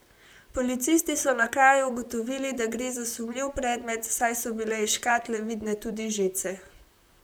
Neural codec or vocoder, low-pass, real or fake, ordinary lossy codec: vocoder, 44.1 kHz, 128 mel bands, Pupu-Vocoder; none; fake; none